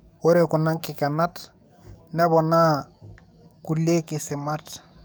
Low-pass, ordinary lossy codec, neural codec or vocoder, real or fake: none; none; codec, 44.1 kHz, 7.8 kbps, DAC; fake